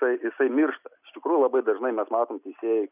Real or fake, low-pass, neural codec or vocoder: real; 3.6 kHz; none